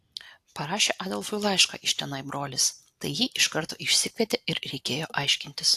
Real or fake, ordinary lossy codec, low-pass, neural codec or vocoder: real; AAC, 64 kbps; 14.4 kHz; none